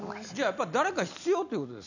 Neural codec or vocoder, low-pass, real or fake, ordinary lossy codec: none; 7.2 kHz; real; none